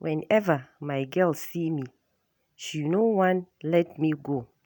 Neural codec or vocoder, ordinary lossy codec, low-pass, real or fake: none; none; none; real